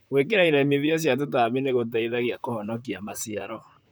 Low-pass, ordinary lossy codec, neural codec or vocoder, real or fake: none; none; vocoder, 44.1 kHz, 128 mel bands, Pupu-Vocoder; fake